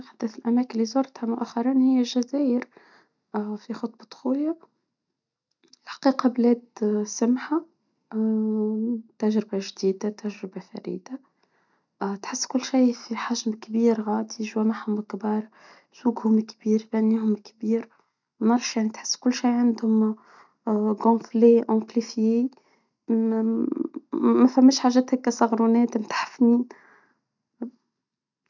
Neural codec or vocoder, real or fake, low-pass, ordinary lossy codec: none; real; 7.2 kHz; none